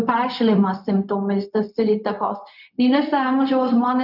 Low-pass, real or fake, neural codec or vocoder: 5.4 kHz; fake; codec, 16 kHz, 0.4 kbps, LongCat-Audio-Codec